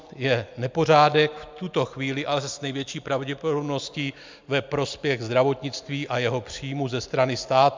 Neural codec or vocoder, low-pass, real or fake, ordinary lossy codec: none; 7.2 kHz; real; MP3, 64 kbps